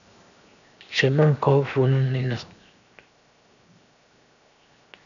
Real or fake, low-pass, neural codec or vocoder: fake; 7.2 kHz; codec, 16 kHz, 0.7 kbps, FocalCodec